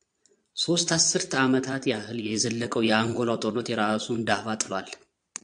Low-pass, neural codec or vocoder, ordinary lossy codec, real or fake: 9.9 kHz; vocoder, 22.05 kHz, 80 mel bands, Vocos; AAC, 64 kbps; fake